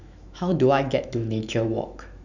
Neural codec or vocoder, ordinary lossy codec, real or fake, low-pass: autoencoder, 48 kHz, 128 numbers a frame, DAC-VAE, trained on Japanese speech; none; fake; 7.2 kHz